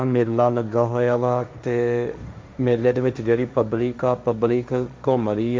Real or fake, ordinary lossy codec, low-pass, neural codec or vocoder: fake; none; none; codec, 16 kHz, 1.1 kbps, Voila-Tokenizer